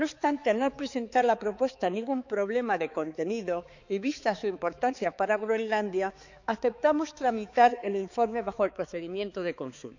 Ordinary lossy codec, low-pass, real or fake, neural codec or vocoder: none; 7.2 kHz; fake; codec, 16 kHz, 4 kbps, X-Codec, HuBERT features, trained on balanced general audio